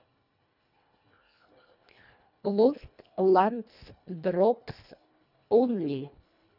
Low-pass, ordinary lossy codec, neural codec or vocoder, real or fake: 5.4 kHz; none; codec, 24 kHz, 1.5 kbps, HILCodec; fake